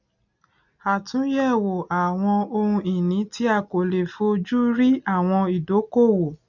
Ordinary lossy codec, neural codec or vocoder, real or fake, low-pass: none; none; real; 7.2 kHz